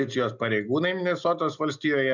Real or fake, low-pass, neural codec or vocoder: real; 7.2 kHz; none